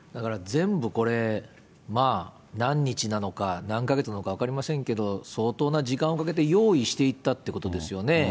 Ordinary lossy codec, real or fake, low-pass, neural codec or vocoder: none; real; none; none